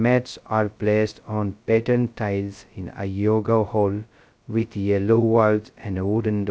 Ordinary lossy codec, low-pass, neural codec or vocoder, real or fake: none; none; codec, 16 kHz, 0.2 kbps, FocalCodec; fake